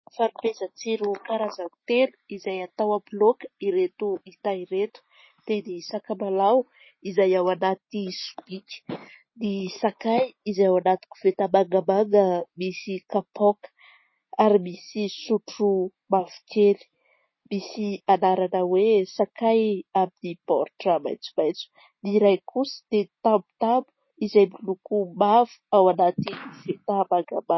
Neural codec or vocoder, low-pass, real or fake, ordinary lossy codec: none; 7.2 kHz; real; MP3, 24 kbps